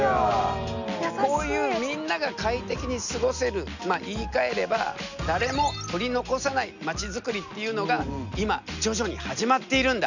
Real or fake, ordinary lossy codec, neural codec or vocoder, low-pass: real; none; none; 7.2 kHz